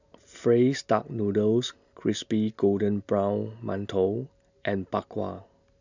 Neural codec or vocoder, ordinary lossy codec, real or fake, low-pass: none; none; real; 7.2 kHz